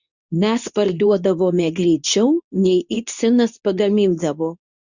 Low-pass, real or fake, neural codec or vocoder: 7.2 kHz; fake; codec, 24 kHz, 0.9 kbps, WavTokenizer, medium speech release version 2